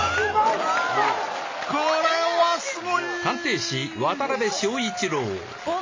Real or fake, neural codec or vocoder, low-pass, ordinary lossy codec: real; none; 7.2 kHz; MP3, 32 kbps